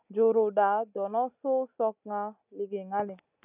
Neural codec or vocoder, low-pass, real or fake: none; 3.6 kHz; real